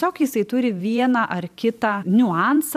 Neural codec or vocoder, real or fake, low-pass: vocoder, 44.1 kHz, 128 mel bands every 512 samples, BigVGAN v2; fake; 14.4 kHz